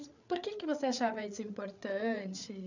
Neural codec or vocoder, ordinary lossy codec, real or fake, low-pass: codec, 16 kHz, 8 kbps, FreqCodec, larger model; none; fake; 7.2 kHz